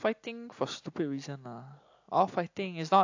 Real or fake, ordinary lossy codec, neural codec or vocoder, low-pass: real; AAC, 48 kbps; none; 7.2 kHz